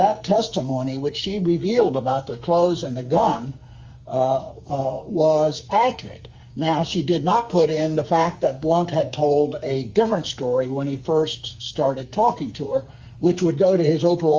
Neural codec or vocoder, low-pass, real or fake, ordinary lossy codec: codec, 44.1 kHz, 2.6 kbps, SNAC; 7.2 kHz; fake; Opus, 32 kbps